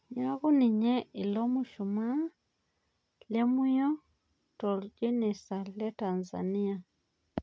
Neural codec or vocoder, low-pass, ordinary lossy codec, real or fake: none; none; none; real